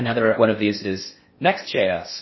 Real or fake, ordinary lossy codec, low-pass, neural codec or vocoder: fake; MP3, 24 kbps; 7.2 kHz; codec, 16 kHz in and 24 kHz out, 0.6 kbps, FocalCodec, streaming, 4096 codes